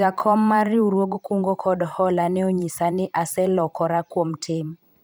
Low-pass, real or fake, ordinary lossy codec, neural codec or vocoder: none; fake; none; vocoder, 44.1 kHz, 128 mel bands every 512 samples, BigVGAN v2